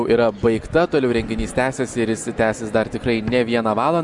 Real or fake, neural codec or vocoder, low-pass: real; none; 10.8 kHz